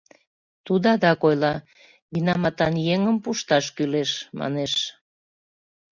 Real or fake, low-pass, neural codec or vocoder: real; 7.2 kHz; none